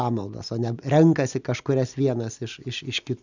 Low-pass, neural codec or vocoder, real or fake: 7.2 kHz; none; real